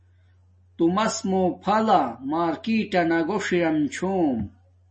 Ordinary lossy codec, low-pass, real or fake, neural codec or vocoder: MP3, 32 kbps; 10.8 kHz; real; none